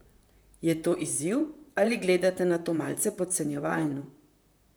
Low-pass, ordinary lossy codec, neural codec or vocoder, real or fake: none; none; vocoder, 44.1 kHz, 128 mel bands, Pupu-Vocoder; fake